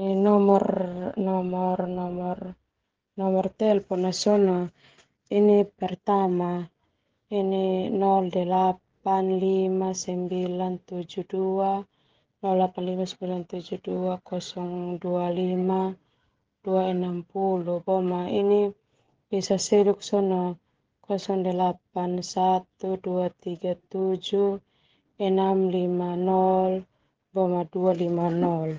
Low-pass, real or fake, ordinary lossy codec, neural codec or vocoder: 7.2 kHz; fake; Opus, 16 kbps; codec, 16 kHz, 16 kbps, FreqCodec, smaller model